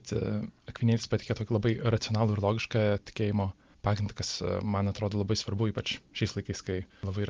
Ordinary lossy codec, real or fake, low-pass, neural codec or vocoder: Opus, 32 kbps; real; 7.2 kHz; none